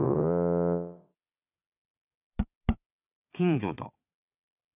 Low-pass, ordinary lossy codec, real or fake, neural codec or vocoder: 3.6 kHz; none; fake; vocoder, 22.05 kHz, 80 mel bands, WaveNeXt